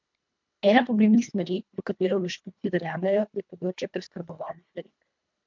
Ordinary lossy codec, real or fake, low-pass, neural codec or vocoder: MP3, 64 kbps; fake; 7.2 kHz; codec, 24 kHz, 1.5 kbps, HILCodec